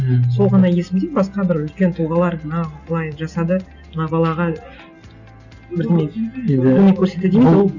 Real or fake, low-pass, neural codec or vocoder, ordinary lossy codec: real; 7.2 kHz; none; none